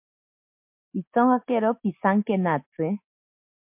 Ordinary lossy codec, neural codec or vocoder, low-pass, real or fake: MP3, 32 kbps; none; 3.6 kHz; real